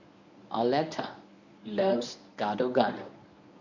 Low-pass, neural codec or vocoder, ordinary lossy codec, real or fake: 7.2 kHz; codec, 24 kHz, 0.9 kbps, WavTokenizer, medium speech release version 1; none; fake